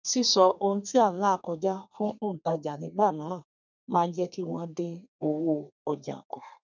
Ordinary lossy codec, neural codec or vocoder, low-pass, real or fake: none; codec, 32 kHz, 1.9 kbps, SNAC; 7.2 kHz; fake